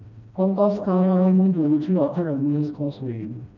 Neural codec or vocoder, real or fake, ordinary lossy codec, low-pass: codec, 16 kHz, 1 kbps, FreqCodec, smaller model; fake; none; 7.2 kHz